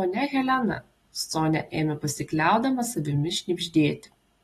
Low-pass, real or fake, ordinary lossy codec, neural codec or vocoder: 19.8 kHz; real; AAC, 32 kbps; none